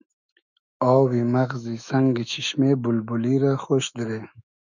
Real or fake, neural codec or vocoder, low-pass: fake; autoencoder, 48 kHz, 128 numbers a frame, DAC-VAE, trained on Japanese speech; 7.2 kHz